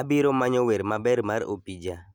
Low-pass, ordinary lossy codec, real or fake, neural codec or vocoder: 19.8 kHz; none; real; none